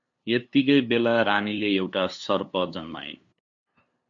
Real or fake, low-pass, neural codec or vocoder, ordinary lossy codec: fake; 7.2 kHz; codec, 16 kHz, 2 kbps, FunCodec, trained on LibriTTS, 25 frames a second; MP3, 64 kbps